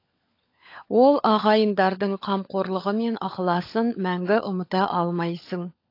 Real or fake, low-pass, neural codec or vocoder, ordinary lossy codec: fake; 5.4 kHz; codec, 16 kHz, 16 kbps, FunCodec, trained on LibriTTS, 50 frames a second; AAC, 32 kbps